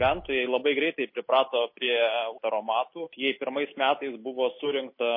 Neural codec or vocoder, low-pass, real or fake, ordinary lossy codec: none; 5.4 kHz; real; MP3, 24 kbps